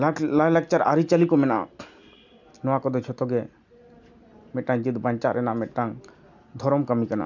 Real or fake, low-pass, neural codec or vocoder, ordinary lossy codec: real; 7.2 kHz; none; none